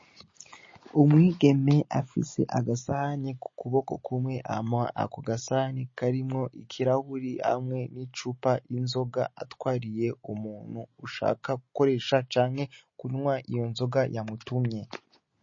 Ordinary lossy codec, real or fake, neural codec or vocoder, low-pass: MP3, 32 kbps; real; none; 7.2 kHz